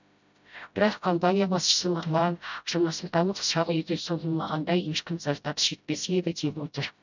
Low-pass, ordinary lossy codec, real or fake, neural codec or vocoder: 7.2 kHz; none; fake; codec, 16 kHz, 0.5 kbps, FreqCodec, smaller model